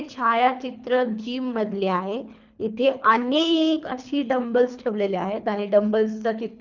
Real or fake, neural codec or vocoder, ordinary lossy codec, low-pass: fake; codec, 24 kHz, 3 kbps, HILCodec; none; 7.2 kHz